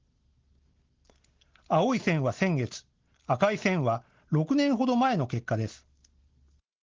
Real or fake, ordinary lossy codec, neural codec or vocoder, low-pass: real; Opus, 16 kbps; none; 7.2 kHz